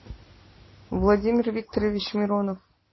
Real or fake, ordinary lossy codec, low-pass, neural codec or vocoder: real; MP3, 24 kbps; 7.2 kHz; none